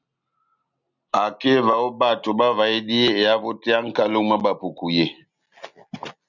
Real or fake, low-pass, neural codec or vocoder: real; 7.2 kHz; none